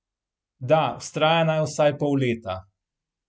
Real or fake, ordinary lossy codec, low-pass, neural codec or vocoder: real; none; none; none